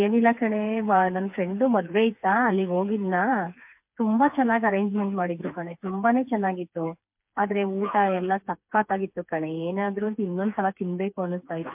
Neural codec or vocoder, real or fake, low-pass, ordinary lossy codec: codec, 16 kHz, 4 kbps, FreqCodec, smaller model; fake; 3.6 kHz; MP3, 32 kbps